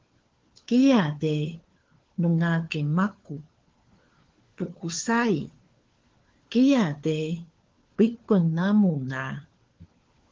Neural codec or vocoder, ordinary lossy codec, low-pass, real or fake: codec, 16 kHz, 4 kbps, FunCodec, trained on LibriTTS, 50 frames a second; Opus, 16 kbps; 7.2 kHz; fake